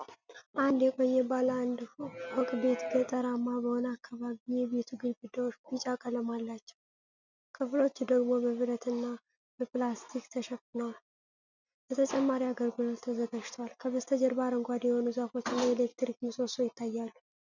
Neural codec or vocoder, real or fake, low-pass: none; real; 7.2 kHz